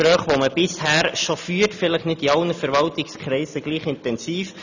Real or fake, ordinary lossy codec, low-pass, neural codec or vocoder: real; none; 7.2 kHz; none